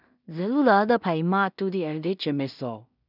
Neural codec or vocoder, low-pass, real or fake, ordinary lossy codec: codec, 16 kHz in and 24 kHz out, 0.4 kbps, LongCat-Audio-Codec, two codebook decoder; 5.4 kHz; fake; none